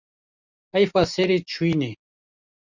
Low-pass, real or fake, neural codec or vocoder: 7.2 kHz; real; none